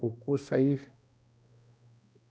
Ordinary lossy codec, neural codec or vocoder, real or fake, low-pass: none; codec, 16 kHz, 1 kbps, X-Codec, HuBERT features, trained on balanced general audio; fake; none